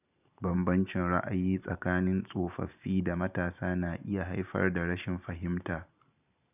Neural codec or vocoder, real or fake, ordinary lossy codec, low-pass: vocoder, 44.1 kHz, 128 mel bands every 512 samples, BigVGAN v2; fake; none; 3.6 kHz